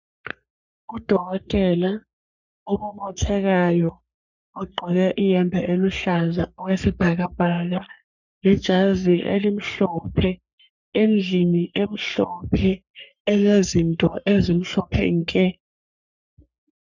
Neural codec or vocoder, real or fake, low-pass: codec, 44.1 kHz, 3.4 kbps, Pupu-Codec; fake; 7.2 kHz